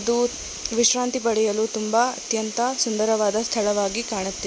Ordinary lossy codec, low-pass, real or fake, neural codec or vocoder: none; none; real; none